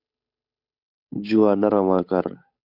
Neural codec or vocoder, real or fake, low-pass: codec, 16 kHz, 8 kbps, FunCodec, trained on Chinese and English, 25 frames a second; fake; 5.4 kHz